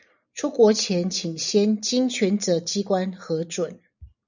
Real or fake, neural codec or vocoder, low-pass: real; none; 7.2 kHz